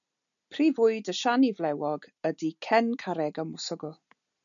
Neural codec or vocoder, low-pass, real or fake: none; 7.2 kHz; real